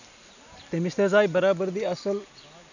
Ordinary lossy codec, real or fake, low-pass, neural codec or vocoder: none; real; 7.2 kHz; none